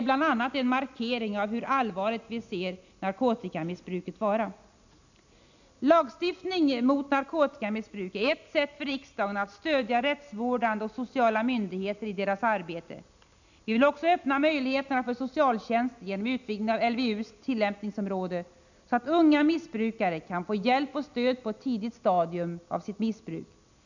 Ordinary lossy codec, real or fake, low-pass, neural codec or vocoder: none; real; 7.2 kHz; none